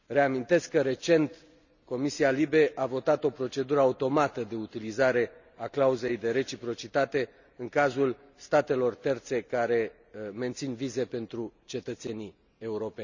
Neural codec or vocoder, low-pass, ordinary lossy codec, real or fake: none; 7.2 kHz; none; real